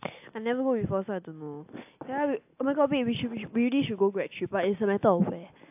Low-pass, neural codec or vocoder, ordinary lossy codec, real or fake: 3.6 kHz; none; AAC, 32 kbps; real